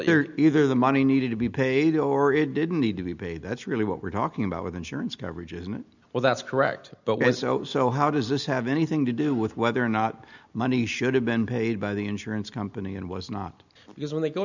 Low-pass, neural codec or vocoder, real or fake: 7.2 kHz; none; real